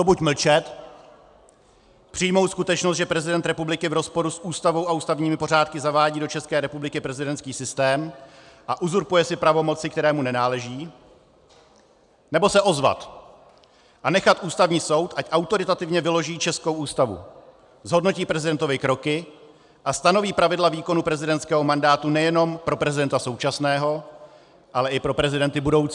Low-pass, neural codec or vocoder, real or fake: 10.8 kHz; none; real